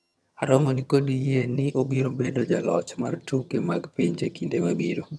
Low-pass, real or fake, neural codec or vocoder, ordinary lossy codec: none; fake; vocoder, 22.05 kHz, 80 mel bands, HiFi-GAN; none